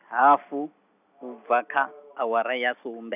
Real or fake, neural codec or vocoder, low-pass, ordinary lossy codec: real; none; 3.6 kHz; none